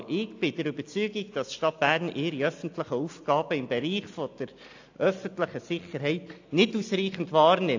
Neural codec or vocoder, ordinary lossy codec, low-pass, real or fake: none; AAC, 48 kbps; 7.2 kHz; real